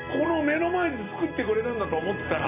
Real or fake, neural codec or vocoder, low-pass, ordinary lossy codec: real; none; 3.6 kHz; none